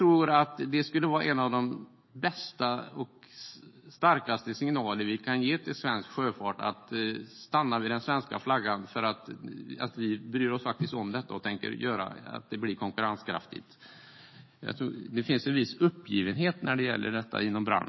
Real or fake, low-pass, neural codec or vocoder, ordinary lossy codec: fake; 7.2 kHz; autoencoder, 48 kHz, 128 numbers a frame, DAC-VAE, trained on Japanese speech; MP3, 24 kbps